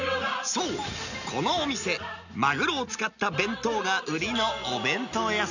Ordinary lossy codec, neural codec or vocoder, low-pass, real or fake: none; none; 7.2 kHz; real